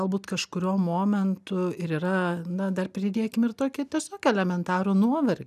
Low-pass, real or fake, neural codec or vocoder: 14.4 kHz; real; none